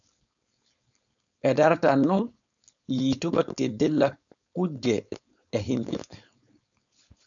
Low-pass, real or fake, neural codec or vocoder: 7.2 kHz; fake; codec, 16 kHz, 4.8 kbps, FACodec